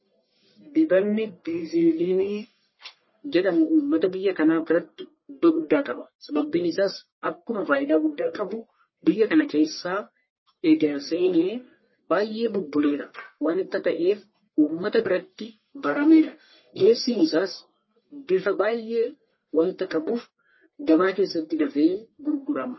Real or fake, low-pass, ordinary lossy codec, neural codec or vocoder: fake; 7.2 kHz; MP3, 24 kbps; codec, 44.1 kHz, 1.7 kbps, Pupu-Codec